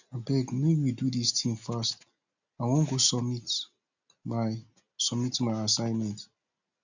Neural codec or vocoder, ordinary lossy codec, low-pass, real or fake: none; none; 7.2 kHz; real